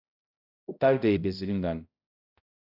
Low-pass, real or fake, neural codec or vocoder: 5.4 kHz; fake; codec, 16 kHz, 0.5 kbps, X-Codec, HuBERT features, trained on balanced general audio